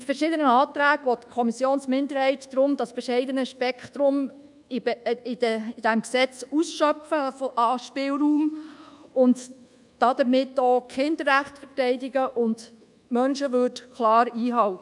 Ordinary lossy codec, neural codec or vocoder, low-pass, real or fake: none; codec, 24 kHz, 1.2 kbps, DualCodec; 10.8 kHz; fake